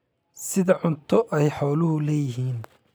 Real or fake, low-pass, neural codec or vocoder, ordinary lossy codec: real; none; none; none